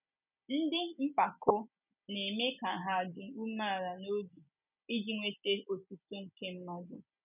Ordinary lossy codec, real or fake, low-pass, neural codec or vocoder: none; real; 3.6 kHz; none